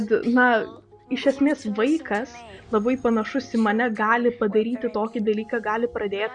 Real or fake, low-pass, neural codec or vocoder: real; 9.9 kHz; none